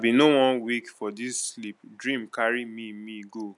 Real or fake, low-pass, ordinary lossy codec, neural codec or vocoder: real; 10.8 kHz; none; none